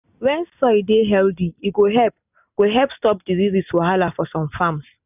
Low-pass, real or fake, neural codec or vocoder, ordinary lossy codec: 3.6 kHz; real; none; none